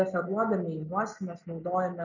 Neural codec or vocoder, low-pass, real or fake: none; 7.2 kHz; real